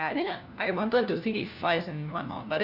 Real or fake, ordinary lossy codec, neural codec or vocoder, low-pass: fake; none; codec, 16 kHz, 1 kbps, FunCodec, trained on LibriTTS, 50 frames a second; 5.4 kHz